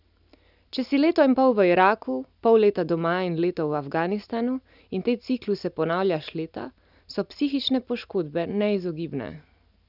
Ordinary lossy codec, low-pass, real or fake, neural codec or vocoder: none; 5.4 kHz; real; none